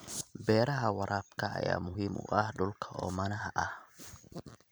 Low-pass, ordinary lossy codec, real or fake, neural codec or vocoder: none; none; fake; vocoder, 44.1 kHz, 128 mel bands every 256 samples, BigVGAN v2